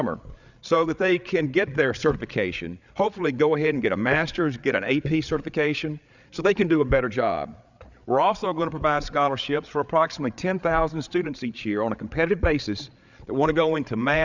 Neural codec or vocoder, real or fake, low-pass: codec, 16 kHz, 8 kbps, FreqCodec, larger model; fake; 7.2 kHz